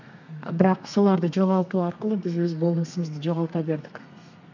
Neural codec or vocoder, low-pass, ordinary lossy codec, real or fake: codec, 32 kHz, 1.9 kbps, SNAC; 7.2 kHz; none; fake